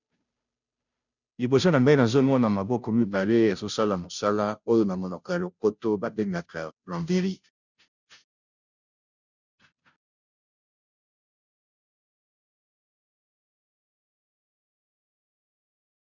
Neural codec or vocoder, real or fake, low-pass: codec, 16 kHz, 0.5 kbps, FunCodec, trained on Chinese and English, 25 frames a second; fake; 7.2 kHz